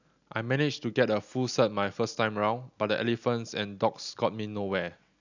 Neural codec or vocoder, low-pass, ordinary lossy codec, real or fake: none; 7.2 kHz; none; real